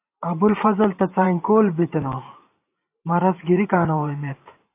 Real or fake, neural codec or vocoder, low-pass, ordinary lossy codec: fake; vocoder, 44.1 kHz, 128 mel bands every 512 samples, BigVGAN v2; 3.6 kHz; AAC, 24 kbps